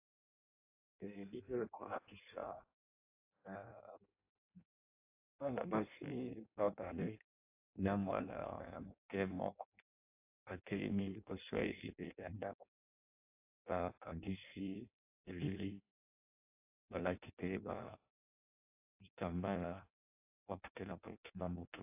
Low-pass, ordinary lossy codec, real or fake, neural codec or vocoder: 3.6 kHz; AAC, 32 kbps; fake; codec, 16 kHz in and 24 kHz out, 0.6 kbps, FireRedTTS-2 codec